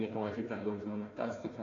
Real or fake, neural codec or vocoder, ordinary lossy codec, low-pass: fake; codec, 16 kHz, 1 kbps, FunCodec, trained on Chinese and English, 50 frames a second; AAC, 32 kbps; 7.2 kHz